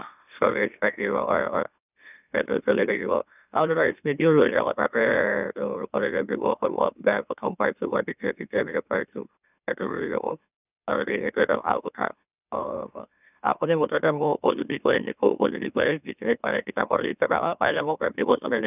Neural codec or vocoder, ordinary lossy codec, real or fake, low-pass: autoencoder, 44.1 kHz, a latent of 192 numbers a frame, MeloTTS; none; fake; 3.6 kHz